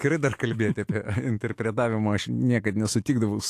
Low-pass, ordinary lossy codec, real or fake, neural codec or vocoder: 14.4 kHz; AAC, 64 kbps; fake; autoencoder, 48 kHz, 128 numbers a frame, DAC-VAE, trained on Japanese speech